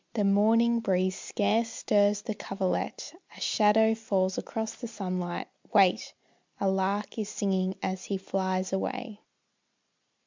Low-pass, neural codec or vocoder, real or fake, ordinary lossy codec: 7.2 kHz; none; real; MP3, 64 kbps